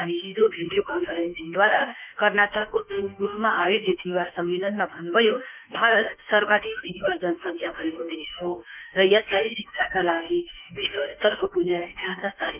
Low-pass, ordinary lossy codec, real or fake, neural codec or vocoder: 3.6 kHz; none; fake; autoencoder, 48 kHz, 32 numbers a frame, DAC-VAE, trained on Japanese speech